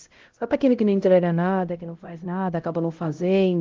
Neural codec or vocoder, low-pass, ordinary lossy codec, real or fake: codec, 16 kHz, 0.5 kbps, X-Codec, HuBERT features, trained on LibriSpeech; 7.2 kHz; Opus, 16 kbps; fake